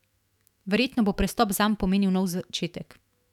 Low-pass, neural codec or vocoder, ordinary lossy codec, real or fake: 19.8 kHz; autoencoder, 48 kHz, 128 numbers a frame, DAC-VAE, trained on Japanese speech; none; fake